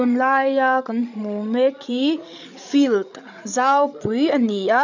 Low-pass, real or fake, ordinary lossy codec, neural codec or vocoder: 7.2 kHz; fake; none; codec, 16 kHz, 16 kbps, FreqCodec, larger model